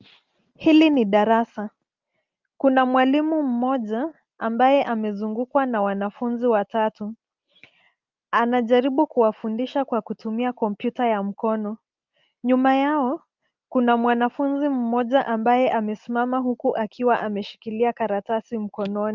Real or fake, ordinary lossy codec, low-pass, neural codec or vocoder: real; Opus, 24 kbps; 7.2 kHz; none